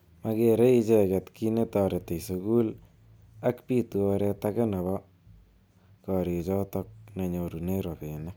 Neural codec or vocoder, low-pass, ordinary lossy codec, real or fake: none; none; none; real